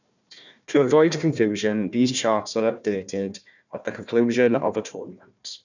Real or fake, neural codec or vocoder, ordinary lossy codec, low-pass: fake; codec, 16 kHz, 1 kbps, FunCodec, trained on Chinese and English, 50 frames a second; none; 7.2 kHz